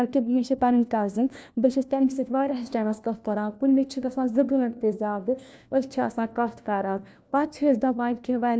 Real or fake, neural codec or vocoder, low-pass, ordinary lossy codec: fake; codec, 16 kHz, 1 kbps, FunCodec, trained on LibriTTS, 50 frames a second; none; none